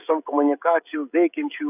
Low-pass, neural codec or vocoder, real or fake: 3.6 kHz; codec, 16 kHz, 16 kbps, FreqCodec, smaller model; fake